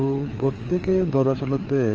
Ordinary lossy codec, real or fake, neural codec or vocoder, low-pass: Opus, 24 kbps; fake; codec, 16 kHz, 4 kbps, FreqCodec, larger model; 7.2 kHz